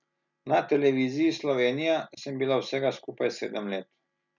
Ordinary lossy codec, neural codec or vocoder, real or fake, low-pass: none; none; real; 7.2 kHz